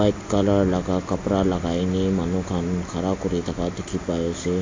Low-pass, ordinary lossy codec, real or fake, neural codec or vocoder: 7.2 kHz; AAC, 32 kbps; real; none